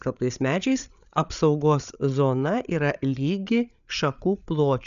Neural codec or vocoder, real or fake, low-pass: codec, 16 kHz, 8 kbps, FreqCodec, larger model; fake; 7.2 kHz